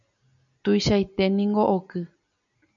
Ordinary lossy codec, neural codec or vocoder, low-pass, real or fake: MP3, 96 kbps; none; 7.2 kHz; real